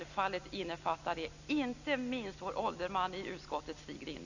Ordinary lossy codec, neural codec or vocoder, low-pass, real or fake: none; none; 7.2 kHz; real